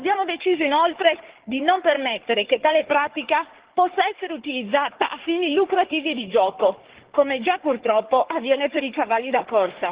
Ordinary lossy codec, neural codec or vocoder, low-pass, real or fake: Opus, 16 kbps; codec, 44.1 kHz, 3.4 kbps, Pupu-Codec; 3.6 kHz; fake